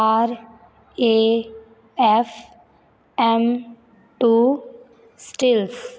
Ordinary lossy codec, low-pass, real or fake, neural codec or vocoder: none; none; real; none